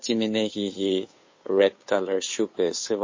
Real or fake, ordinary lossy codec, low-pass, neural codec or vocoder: fake; MP3, 32 kbps; 7.2 kHz; codec, 16 kHz, 2 kbps, FunCodec, trained on Chinese and English, 25 frames a second